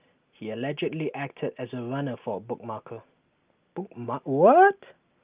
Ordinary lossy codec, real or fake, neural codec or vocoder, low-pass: Opus, 32 kbps; real; none; 3.6 kHz